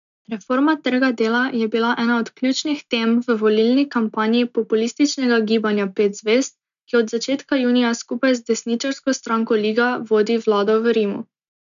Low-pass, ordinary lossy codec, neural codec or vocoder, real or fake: 7.2 kHz; none; none; real